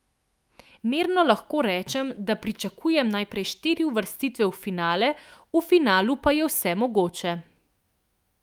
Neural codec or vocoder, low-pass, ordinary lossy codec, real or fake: autoencoder, 48 kHz, 128 numbers a frame, DAC-VAE, trained on Japanese speech; 19.8 kHz; Opus, 32 kbps; fake